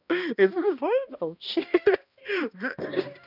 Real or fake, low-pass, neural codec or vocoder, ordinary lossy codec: fake; 5.4 kHz; codec, 16 kHz, 1 kbps, X-Codec, HuBERT features, trained on balanced general audio; none